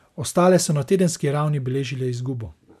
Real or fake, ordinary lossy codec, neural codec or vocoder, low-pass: real; none; none; 14.4 kHz